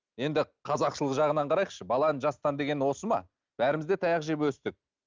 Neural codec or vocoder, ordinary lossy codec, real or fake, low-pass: none; Opus, 24 kbps; real; 7.2 kHz